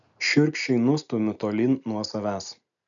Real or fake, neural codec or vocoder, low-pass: real; none; 7.2 kHz